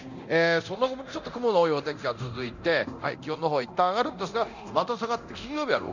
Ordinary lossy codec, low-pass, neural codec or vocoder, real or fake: none; 7.2 kHz; codec, 24 kHz, 0.9 kbps, DualCodec; fake